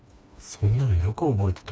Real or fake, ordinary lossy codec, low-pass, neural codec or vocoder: fake; none; none; codec, 16 kHz, 2 kbps, FreqCodec, smaller model